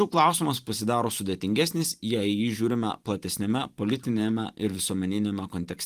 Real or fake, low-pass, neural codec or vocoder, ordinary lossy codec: fake; 14.4 kHz; vocoder, 44.1 kHz, 128 mel bands every 512 samples, BigVGAN v2; Opus, 24 kbps